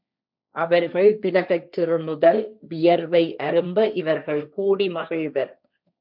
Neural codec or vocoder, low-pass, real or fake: codec, 16 kHz, 1.1 kbps, Voila-Tokenizer; 5.4 kHz; fake